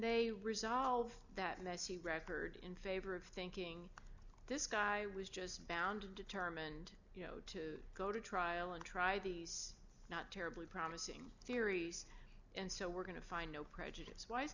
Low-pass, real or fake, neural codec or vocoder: 7.2 kHz; real; none